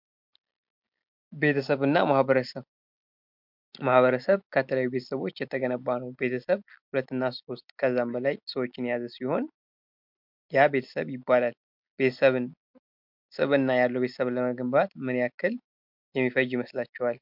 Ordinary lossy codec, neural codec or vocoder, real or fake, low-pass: MP3, 48 kbps; none; real; 5.4 kHz